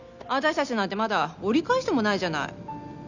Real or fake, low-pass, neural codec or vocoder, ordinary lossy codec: real; 7.2 kHz; none; none